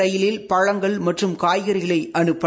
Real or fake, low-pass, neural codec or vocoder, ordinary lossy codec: real; none; none; none